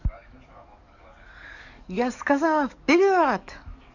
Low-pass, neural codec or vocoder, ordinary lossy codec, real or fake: 7.2 kHz; codec, 44.1 kHz, 7.8 kbps, DAC; none; fake